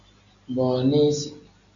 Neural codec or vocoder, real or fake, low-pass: none; real; 7.2 kHz